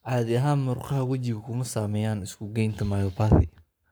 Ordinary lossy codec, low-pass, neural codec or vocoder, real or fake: none; none; codec, 44.1 kHz, 7.8 kbps, Pupu-Codec; fake